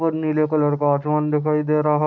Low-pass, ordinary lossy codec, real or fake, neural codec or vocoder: 7.2 kHz; none; real; none